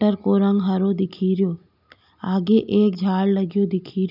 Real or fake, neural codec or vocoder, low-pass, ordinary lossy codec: real; none; 5.4 kHz; none